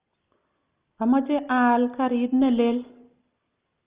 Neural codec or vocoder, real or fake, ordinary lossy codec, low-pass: none; real; Opus, 32 kbps; 3.6 kHz